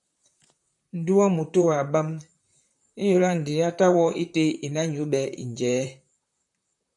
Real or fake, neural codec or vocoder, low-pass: fake; vocoder, 44.1 kHz, 128 mel bands, Pupu-Vocoder; 10.8 kHz